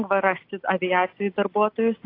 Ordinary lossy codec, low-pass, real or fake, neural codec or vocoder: AAC, 32 kbps; 5.4 kHz; real; none